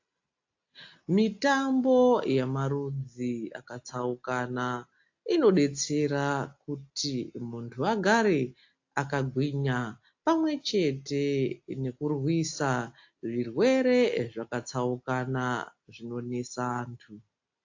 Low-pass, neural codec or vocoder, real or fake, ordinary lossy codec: 7.2 kHz; none; real; AAC, 48 kbps